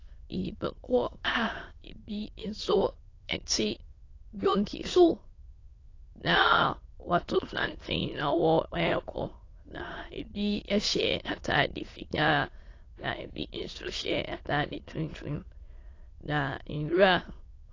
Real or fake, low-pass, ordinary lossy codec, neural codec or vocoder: fake; 7.2 kHz; AAC, 32 kbps; autoencoder, 22.05 kHz, a latent of 192 numbers a frame, VITS, trained on many speakers